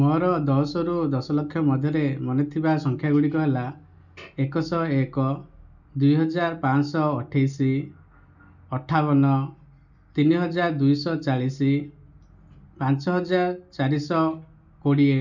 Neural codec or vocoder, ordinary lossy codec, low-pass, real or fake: none; none; 7.2 kHz; real